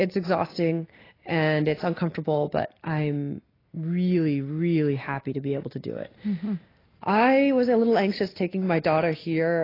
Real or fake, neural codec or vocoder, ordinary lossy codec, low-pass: real; none; AAC, 24 kbps; 5.4 kHz